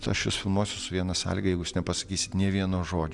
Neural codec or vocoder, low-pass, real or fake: none; 10.8 kHz; real